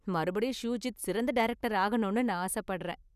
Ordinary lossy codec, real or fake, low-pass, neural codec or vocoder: none; real; 14.4 kHz; none